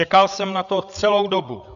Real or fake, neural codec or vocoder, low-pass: fake; codec, 16 kHz, 8 kbps, FreqCodec, larger model; 7.2 kHz